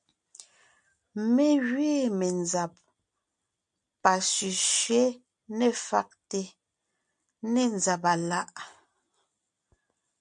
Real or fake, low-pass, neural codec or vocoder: real; 9.9 kHz; none